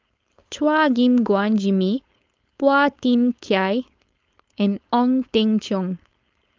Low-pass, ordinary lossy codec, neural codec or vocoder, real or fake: 7.2 kHz; Opus, 32 kbps; codec, 16 kHz, 4.8 kbps, FACodec; fake